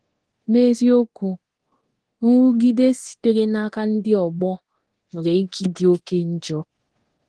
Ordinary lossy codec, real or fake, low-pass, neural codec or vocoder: Opus, 16 kbps; fake; 10.8 kHz; codec, 24 kHz, 0.9 kbps, DualCodec